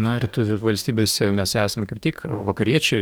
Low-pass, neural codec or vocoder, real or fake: 19.8 kHz; codec, 44.1 kHz, 2.6 kbps, DAC; fake